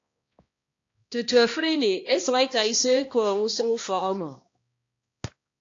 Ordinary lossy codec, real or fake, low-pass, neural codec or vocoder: AAC, 48 kbps; fake; 7.2 kHz; codec, 16 kHz, 1 kbps, X-Codec, HuBERT features, trained on balanced general audio